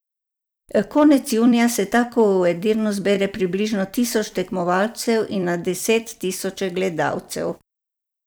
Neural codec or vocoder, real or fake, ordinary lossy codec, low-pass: vocoder, 44.1 kHz, 128 mel bands every 256 samples, BigVGAN v2; fake; none; none